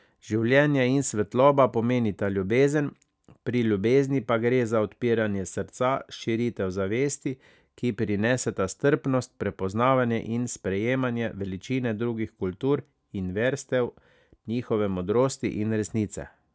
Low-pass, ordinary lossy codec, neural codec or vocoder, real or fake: none; none; none; real